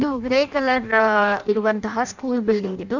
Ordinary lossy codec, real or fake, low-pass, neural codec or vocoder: none; fake; 7.2 kHz; codec, 16 kHz in and 24 kHz out, 0.6 kbps, FireRedTTS-2 codec